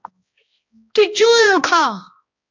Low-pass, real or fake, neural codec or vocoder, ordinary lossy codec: 7.2 kHz; fake; codec, 16 kHz, 1 kbps, X-Codec, HuBERT features, trained on balanced general audio; MP3, 48 kbps